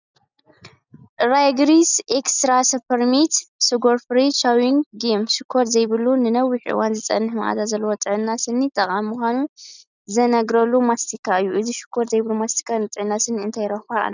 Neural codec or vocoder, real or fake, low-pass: none; real; 7.2 kHz